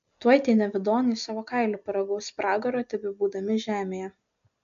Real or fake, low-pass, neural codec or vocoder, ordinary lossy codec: real; 7.2 kHz; none; MP3, 64 kbps